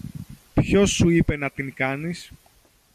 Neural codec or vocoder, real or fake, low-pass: none; real; 14.4 kHz